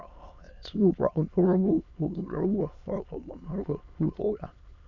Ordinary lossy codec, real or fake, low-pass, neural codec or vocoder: none; fake; 7.2 kHz; autoencoder, 22.05 kHz, a latent of 192 numbers a frame, VITS, trained on many speakers